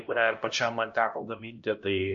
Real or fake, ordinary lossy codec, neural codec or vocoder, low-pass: fake; MP3, 48 kbps; codec, 16 kHz, 1 kbps, X-Codec, HuBERT features, trained on LibriSpeech; 7.2 kHz